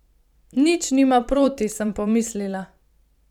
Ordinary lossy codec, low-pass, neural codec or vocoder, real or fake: none; 19.8 kHz; vocoder, 44.1 kHz, 128 mel bands every 512 samples, BigVGAN v2; fake